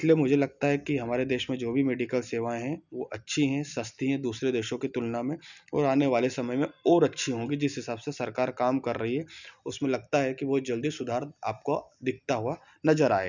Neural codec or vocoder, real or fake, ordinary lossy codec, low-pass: none; real; none; 7.2 kHz